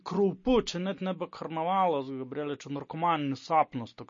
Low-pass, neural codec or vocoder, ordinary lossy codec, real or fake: 7.2 kHz; none; MP3, 32 kbps; real